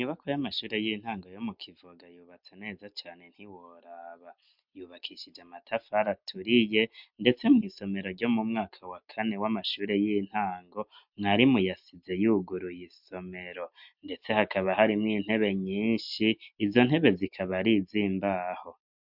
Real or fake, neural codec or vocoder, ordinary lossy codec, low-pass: real; none; MP3, 48 kbps; 5.4 kHz